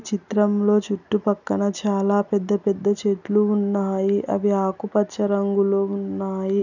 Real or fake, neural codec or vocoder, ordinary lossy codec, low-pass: real; none; none; 7.2 kHz